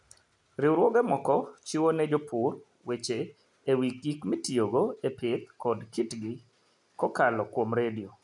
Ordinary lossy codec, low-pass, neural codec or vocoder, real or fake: none; 10.8 kHz; none; real